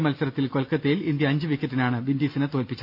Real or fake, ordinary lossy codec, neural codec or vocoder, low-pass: real; none; none; 5.4 kHz